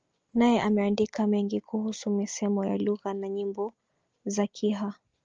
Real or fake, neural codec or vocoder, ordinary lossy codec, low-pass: real; none; Opus, 24 kbps; 7.2 kHz